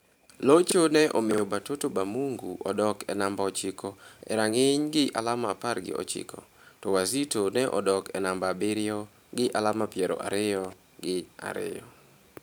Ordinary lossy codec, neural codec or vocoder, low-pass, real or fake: none; vocoder, 44.1 kHz, 128 mel bands every 512 samples, BigVGAN v2; none; fake